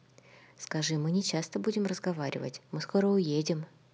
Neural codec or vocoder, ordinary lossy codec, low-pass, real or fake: none; none; none; real